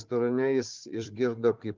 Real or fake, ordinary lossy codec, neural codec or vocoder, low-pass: fake; Opus, 24 kbps; codec, 16 kHz, 4 kbps, FunCodec, trained on Chinese and English, 50 frames a second; 7.2 kHz